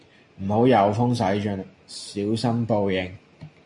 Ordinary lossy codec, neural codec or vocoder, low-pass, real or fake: MP3, 48 kbps; none; 10.8 kHz; real